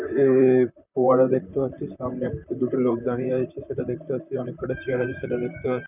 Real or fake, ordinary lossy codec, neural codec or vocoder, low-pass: fake; none; vocoder, 44.1 kHz, 128 mel bands, Pupu-Vocoder; 3.6 kHz